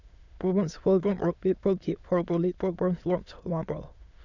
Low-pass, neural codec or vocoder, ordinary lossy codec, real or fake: 7.2 kHz; autoencoder, 22.05 kHz, a latent of 192 numbers a frame, VITS, trained on many speakers; none; fake